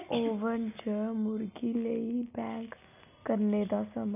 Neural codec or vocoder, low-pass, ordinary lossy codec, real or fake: none; 3.6 kHz; none; real